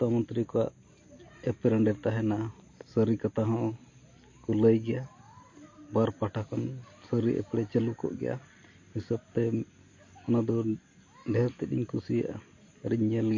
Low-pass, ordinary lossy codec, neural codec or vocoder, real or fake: 7.2 kHz; MP3, 32 kbps; none; real